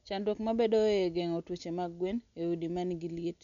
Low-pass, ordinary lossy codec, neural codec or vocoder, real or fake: 7.2 kHz; none; none; real